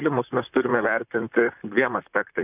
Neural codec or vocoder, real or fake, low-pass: vocoder, 44.1 kHz, 128 mel bands, Pupu-Vocoder; fake; 3.6 kHz